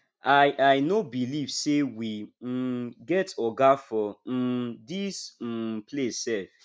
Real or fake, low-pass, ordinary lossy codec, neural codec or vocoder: real; none; none; none